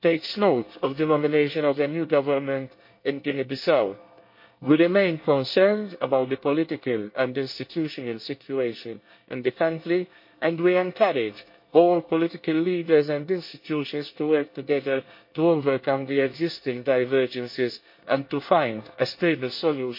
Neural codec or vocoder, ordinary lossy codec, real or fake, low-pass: codec, 24 kHz, 1 kbps, SNAC; MP3, 32 kbps; fake; 5.4 kHz